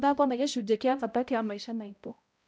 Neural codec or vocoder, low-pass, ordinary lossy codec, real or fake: codec, 16 kHz, 0.5 kbps, X-Codec, HuBERT features, trained on balanced general audio; none; none; fake